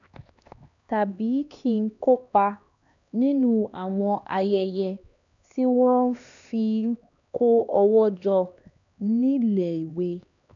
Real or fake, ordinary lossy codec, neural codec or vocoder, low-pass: fake; none; codec, 16 kHz, 2 kbps, X-Codec, HuBERT features, trained on LibriSpeech; 7.2 kHz